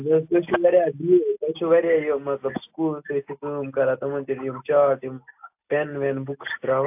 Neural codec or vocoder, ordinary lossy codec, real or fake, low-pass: none; AAC, 32 kbps; real; 3.6 kHz